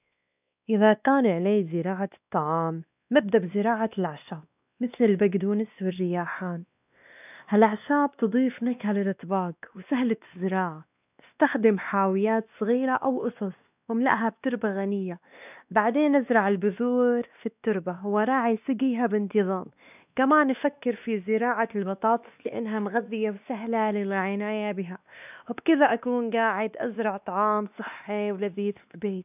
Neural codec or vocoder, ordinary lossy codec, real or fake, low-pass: codec, 16 kHz, 2 kbps, X-Codec, WavLM features, trained on Multilingual LibriSpeech; none; fake; 3.6 kHz